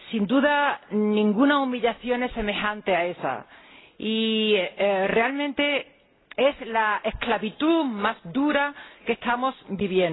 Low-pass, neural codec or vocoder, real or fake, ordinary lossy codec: 7.2 kHz; none; real; AAC, 16 kbps